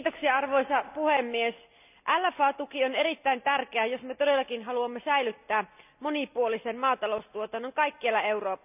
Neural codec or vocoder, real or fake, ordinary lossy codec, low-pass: none; real; none; 3.6 kHz